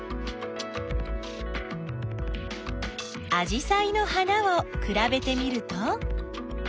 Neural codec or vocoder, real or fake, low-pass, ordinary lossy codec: none; real; none; none